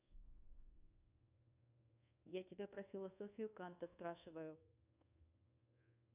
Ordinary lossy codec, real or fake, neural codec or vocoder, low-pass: none; fake; codec, 24 kHz, 1.2 kbps, DualCodec; 3.6 kHz